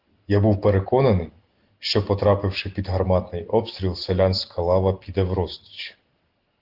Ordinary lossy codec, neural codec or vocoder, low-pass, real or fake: Opus, 16 kbps; none; 5.4 kHz; real